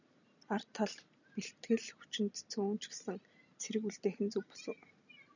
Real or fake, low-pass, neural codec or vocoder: fake; 7.2 kHz; vocoder, 44.1 kHz, 128 mel bands every 256 samples, BigVGAN v2